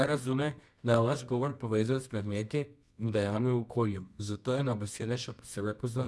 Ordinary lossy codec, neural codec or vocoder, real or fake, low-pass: none; codec, 24 kHz, 0.9 kbps, WavTokenizer, medium music audio release; fake; none